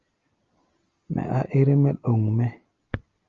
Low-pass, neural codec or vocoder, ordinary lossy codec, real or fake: 7.2 kHz; none; Opus, 32 kbps; real